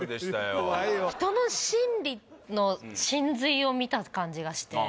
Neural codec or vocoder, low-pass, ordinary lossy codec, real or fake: none; none; none; real